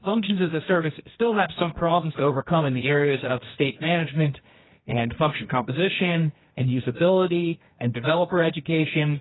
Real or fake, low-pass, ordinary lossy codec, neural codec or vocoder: fake; 7.2 kHz; AAC, 16 kbps; codec, 24 kHz, 0.9 kbps, WavTokenizer, medium music audio release